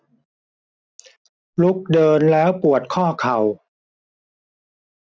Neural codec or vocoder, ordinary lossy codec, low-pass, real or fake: none; none; none; real